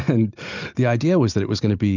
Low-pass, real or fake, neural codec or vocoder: 7.2 kHz; real; none